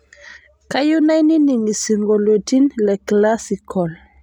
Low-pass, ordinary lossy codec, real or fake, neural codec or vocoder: 19.8 kHz; none; real; none